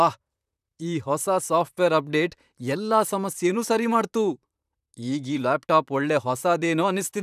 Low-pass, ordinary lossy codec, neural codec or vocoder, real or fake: 14.4 kHz; none; vocoder, 44.1 kHz, 128 mel bands, Pupu-Vocoder; fake